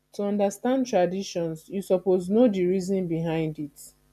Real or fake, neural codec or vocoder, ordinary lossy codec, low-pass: real; none; none; 14.4 kHz